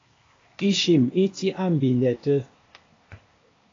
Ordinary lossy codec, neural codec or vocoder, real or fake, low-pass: AAC, 32 kbps; codec, 16 kHz, 0.8 kbps, ZipCodec; fake; 7.2 kHz